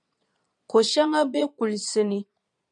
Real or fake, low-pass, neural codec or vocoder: fake; 9.9 kHz; vocoder, 22.05 kHz, 80 mel bands, Vocos